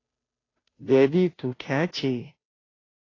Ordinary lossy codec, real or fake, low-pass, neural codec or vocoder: AAC, 32 kbps; fake; 7.2 kHz; codec, 16 kHz, 0.5 kbps, FunCodec, trained on Chinese and English, 25 frames a second